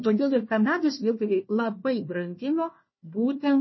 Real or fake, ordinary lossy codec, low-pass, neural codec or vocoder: fake; MP3, 24 kbps; 7.2 kHz; codec, 16 kHz, 1 kbps, FunCodec, trained on Chinese and English, 50 frames a second